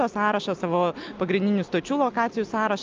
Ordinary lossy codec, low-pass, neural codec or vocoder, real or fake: Opus, 24 kbps; 7.2 kHz; none; real